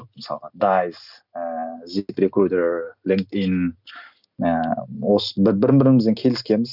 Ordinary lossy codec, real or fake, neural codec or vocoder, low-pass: none; real; none; 5.4 kHz